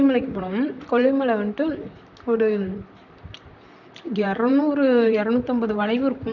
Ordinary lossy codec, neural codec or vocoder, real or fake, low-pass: none; vocoder, 44.1 kHz, 128 mel bands, Pupu-Vocoder; fake; 7.2 kHz